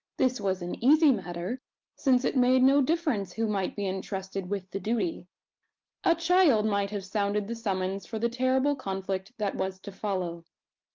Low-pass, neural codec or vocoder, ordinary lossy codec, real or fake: 7.2 kHz; none; Opus, 24 kbps; real